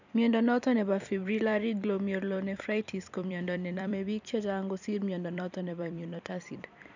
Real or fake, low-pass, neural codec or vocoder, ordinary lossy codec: fake; 7.2 kHz; vocoder, 44.1 kHz, 128 mel bands every 256 samples, BigVGAN v2; none